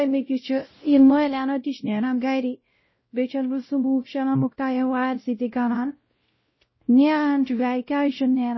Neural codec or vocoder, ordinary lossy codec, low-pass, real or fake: codec, 16 kHz, 0.5 kbps, X-Codec, WavLM features, trained on Multilingual LibriSpeech; MP3, 24 kbps; 7.2 kHz; fake